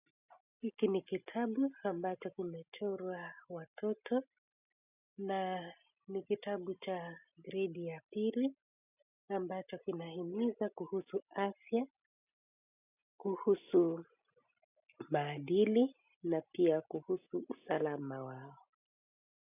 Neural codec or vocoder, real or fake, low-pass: none; real; 3.6 kHz